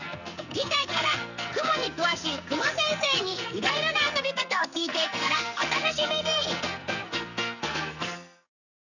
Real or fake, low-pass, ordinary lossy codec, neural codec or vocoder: fake; 7.2 kHz; none; codec, 44.1 kHz, 2.6 kbps, SNAC